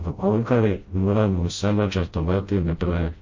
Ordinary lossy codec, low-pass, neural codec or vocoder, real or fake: MP3, 32 kbps; 7.2 kHz; codec, 16 kHz, 0.5 kbps, FreqCodec, smaller model; fake